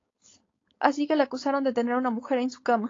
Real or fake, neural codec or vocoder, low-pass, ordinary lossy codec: fake; codec, 16 kHz, 4.8 kbps, FACodec; 7.2 kHz; AAC, 32 kbps